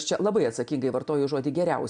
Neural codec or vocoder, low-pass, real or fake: none; 9.9 kHz; real